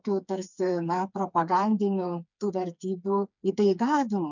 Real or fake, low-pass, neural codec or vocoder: fake; 7.2 kHz; codec, 16 kHz, 4 kbps, FreqCodec, smaller model